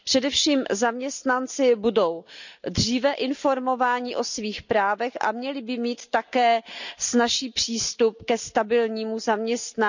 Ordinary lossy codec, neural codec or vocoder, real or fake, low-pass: none; none; real; 7.2 kHz